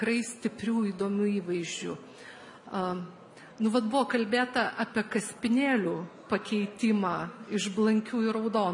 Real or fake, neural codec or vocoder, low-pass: real; none; 10.8 kHz